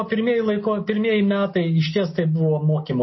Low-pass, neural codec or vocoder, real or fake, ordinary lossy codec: 7.2 kHz; none; real; MP3, 24 kbps